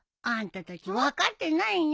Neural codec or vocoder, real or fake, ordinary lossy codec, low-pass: none; real; none; none